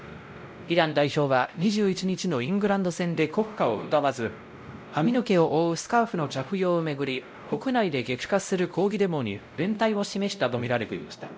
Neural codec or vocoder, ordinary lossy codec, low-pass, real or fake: codec, 16 kHz, 0.5 kbps, X-Codec, WavLM features, trained on Multilingual LibriSpeech; none; none; fake